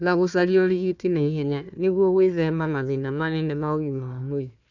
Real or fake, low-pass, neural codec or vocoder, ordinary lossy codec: fake; 7.2 kHz; codec, 16 kHz, 1 kbps, FunCodec, trained on Chinese and English, 50 frames a second; none